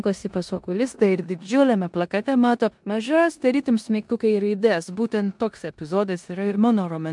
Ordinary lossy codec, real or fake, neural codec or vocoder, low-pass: MP3, 64 kbps; fake; codec, 16 kHz in and 24 kHz out, 0.9 kbps, LongCat-Audio-Codec, four codebook decoder; 10.8 kHz